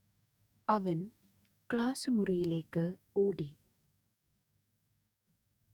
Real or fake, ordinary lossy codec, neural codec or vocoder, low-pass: fake; none; codec, 44.1 kHz, 2.6 kbps, DAC; 19.8 kHz